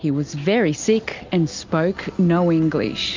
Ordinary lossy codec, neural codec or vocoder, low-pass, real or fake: MP3, 64 kbps; none; 7.2 kHz; real